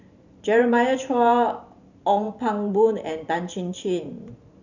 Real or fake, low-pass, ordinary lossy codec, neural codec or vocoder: fake; 7.2 kHz; none; vocoder, 44.1 kHz, 128 mel bands every 512 samples, BigVGAN v2